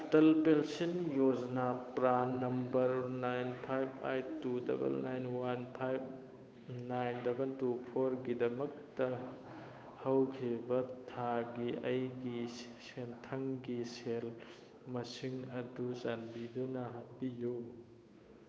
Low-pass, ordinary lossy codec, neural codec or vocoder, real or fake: none; none; codec, 16 kHz, 8 kbps, FunCodec, trained on Chinese and English, 25 frames a second; fake